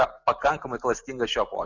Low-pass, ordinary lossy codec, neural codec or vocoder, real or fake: 7.2 kHz; Opus, 64 kbps; none; real